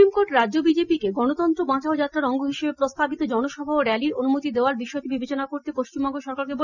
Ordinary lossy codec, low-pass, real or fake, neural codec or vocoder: none; 7.2 kHz; real; none